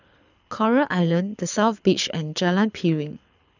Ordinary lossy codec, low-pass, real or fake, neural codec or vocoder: none; 7.2 kHz; fake; codec, 24 kHz, 6 kbps, HILCodec